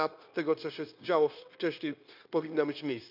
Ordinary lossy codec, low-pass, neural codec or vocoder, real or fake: none; 5.4 kHz; codec, 16 kHz, 0.9 kbps, LongCat-Audio-Codec; fake